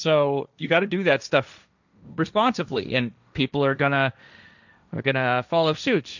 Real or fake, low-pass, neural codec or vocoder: fake; 7.2 kHz; codec, 16 kHz, 1.1 kbps, Voila-Tokenizer